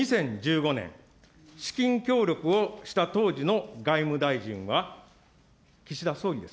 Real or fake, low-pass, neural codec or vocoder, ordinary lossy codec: real; none; none; none